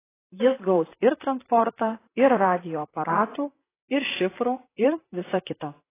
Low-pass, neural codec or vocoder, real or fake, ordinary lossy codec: 3.6 kHz; vocoder, 44.1 kHz, 128 mel bands, Pupu-Vocoder; fake; AAC, 16 kbps